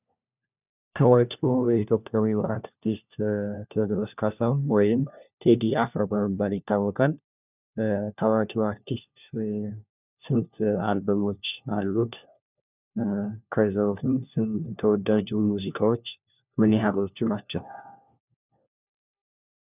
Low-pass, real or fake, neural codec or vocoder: 3.6 kHz; fake; codec, 16 kHz, 1 kbps, FunCodec, trained on LibriTTS, 50 frames a second